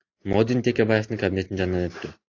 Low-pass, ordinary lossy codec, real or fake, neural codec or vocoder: 7.2 kHz; AAC, 48 kbps; real; none